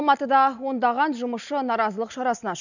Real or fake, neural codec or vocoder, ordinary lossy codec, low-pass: real; none; none; 7.2 kHz